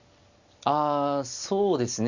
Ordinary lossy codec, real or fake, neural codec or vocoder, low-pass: Opus, 64 kbps; real; none; 7.2 kHz